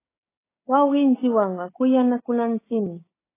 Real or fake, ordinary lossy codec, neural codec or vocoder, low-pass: fake; AAC, 16 kbps; codec, 16 kHz, 6 kbps, DAC; 3.6 kHz